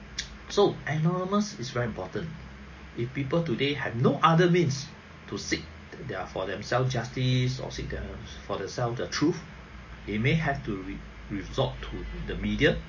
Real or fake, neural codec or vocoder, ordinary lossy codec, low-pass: real; none; MP3, 32 kbps; 7.2 kHz